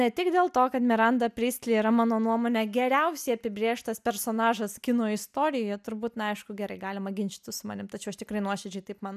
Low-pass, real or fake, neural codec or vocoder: 14.4 kHz; real; none